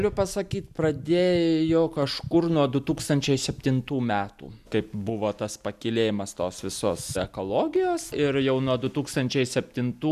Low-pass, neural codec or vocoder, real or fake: 14.4 kHz; none; real